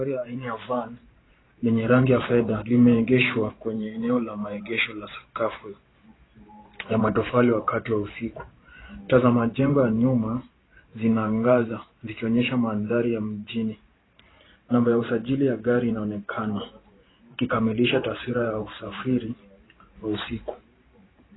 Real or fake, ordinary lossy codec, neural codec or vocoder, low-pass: fake; AAC, 16 kbps; vocoder, 44.1 kHz, 128 mel bands every 512 samples, BigVGAN v2; 7.2 kHz